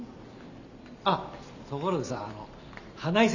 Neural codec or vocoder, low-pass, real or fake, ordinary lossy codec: none; 7.2 kHz; real; none